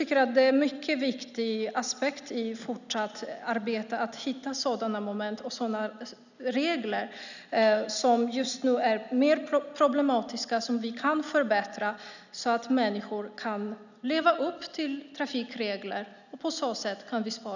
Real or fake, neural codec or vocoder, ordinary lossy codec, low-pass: real; none; none; 7.2 kHz